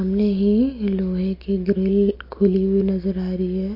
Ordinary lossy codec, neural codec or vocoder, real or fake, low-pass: MP3, 32 kbps; none; real; 5.4 kHz